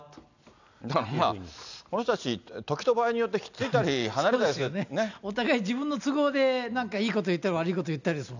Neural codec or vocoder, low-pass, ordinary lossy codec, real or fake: none; 7.2 kHz; none; real